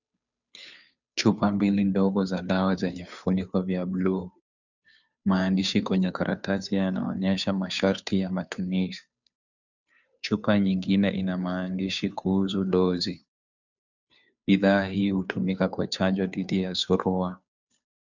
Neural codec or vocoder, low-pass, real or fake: codec, 16 kHz, 2 kbps, FunCodec, trained on Chinese and English, 25 frames a second; 7.2 kHz; fake